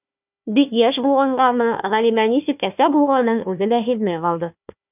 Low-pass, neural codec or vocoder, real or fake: 3.6 kHz; codec, 16 kHz, 1 kbps, FunCodec, trained on Chinese and English, 50 frames a second; fake